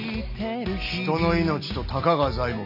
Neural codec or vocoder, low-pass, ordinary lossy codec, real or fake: none; 5.4 kHz; none; real